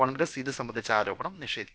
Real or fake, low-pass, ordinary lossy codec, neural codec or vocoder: fake; none; none; codec, 16 kHz, about 1 kbps, DyCAST, with the encoder's durations